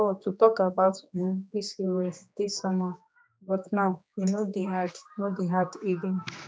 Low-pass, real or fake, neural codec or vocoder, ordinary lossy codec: none; fake; codec, 16 kHz, 2 kbps, X-Codec, HuBERT features, trained on general audio; none